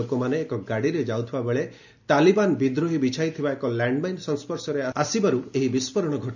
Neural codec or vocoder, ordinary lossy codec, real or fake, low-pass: none; none; real; 7.2 kHz